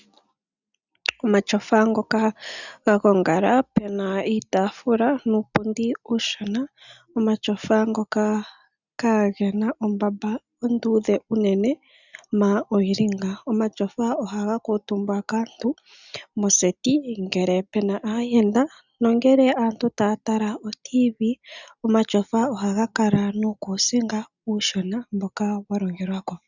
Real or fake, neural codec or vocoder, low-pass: real; none; 7.2 kHz